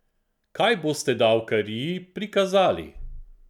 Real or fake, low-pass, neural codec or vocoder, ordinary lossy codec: real; 19.8 kHz; none; none